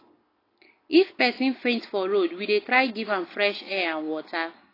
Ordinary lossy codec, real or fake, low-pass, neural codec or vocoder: AAC, 24 kbps; real; 5.4 kHz; none